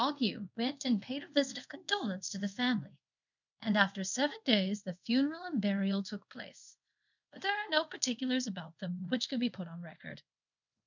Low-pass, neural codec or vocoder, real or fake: 7.2 kHz; codec, 24 kHz, 0.5 kbps, DualCodec; fake